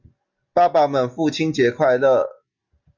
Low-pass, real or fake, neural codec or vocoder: 7.2 kHz; real; none